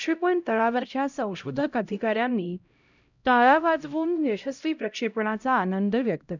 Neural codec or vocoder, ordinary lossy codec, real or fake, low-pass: codec, 16 kHz, 0.5 kbps, X-Codec, HuBERT features, trained on LibriSpeech; none; fake; 7.2 kHz